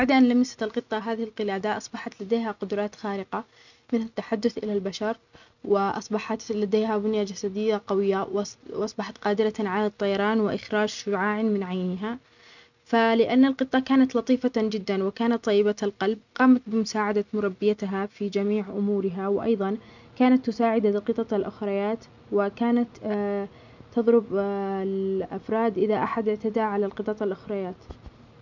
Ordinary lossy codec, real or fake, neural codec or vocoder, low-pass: none; real; none; 7.2 kHz